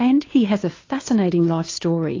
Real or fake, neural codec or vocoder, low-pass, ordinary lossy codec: fake; codec, 16 kHz, 2 kbps, FunCodec, trained on Chinese and English, 25 frames a second; 7.2 kHz; AAC, 32 kbps